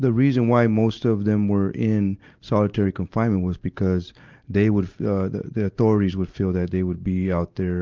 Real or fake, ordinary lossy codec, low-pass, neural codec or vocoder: real; Opus, 32 kbps; 7.2 kHz; none